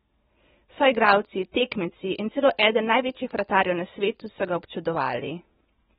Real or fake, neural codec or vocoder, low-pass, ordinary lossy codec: real; none; 10.8 kHz; AAC, 16 kbps